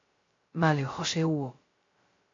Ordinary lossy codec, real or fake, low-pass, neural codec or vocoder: AAC, 32 kbps; fake; 7.2 kHz; codec, 16 kHz, 0.2 kbps, FocalCodec